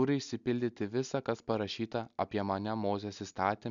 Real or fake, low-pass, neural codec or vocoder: real; 7.2 kHz; none